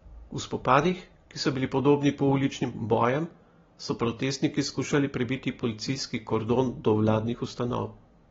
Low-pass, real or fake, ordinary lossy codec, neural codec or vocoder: 7.2 kHz; real; AAC, 24 kbps; none